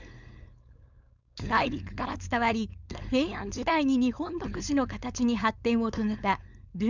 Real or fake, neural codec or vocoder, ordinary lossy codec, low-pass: fake; codec, 16 kHz, 4.8 kbps, FACodec; none; 7.2 kHz